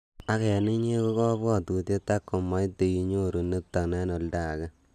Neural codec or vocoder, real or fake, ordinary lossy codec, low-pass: none; real; none; 14.4 kHz